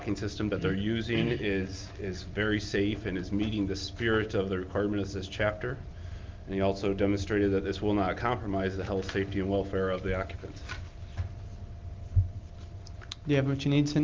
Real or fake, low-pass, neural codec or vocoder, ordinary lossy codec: real; 7.2 kHz; none; Opus, 32 kbps